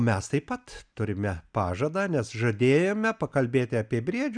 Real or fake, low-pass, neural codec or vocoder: real; 9.9 kHz; none